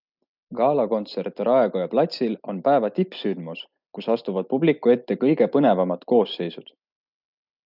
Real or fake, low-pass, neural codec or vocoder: real; 5.4 kHz; none